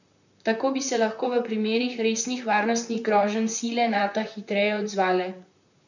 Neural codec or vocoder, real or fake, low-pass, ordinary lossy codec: vocoder, 44.1 kHz, 128 mel bands, Pupu-Vocoder; fake; 7.2 kHz; none